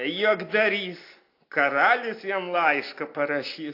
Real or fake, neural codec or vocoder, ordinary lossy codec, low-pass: real; none; AAC, 32 kbps; 5.4 kHz